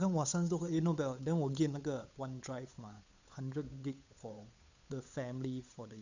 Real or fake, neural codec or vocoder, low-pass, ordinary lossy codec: fake; codec, 16 kHz, 8 kbps, FunCodec, trained on Chinese and English, 25 frames a second; 7.2 kHz; none